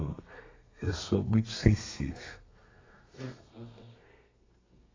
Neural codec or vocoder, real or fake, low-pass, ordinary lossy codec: codec, 32 kHz, 1.9 kbps, SNAC; fake; 7.2 kHz; AAC, 32 kbps